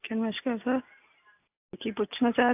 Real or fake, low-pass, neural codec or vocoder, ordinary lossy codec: real; 3.6 kHz; none; AAC, 24 kbps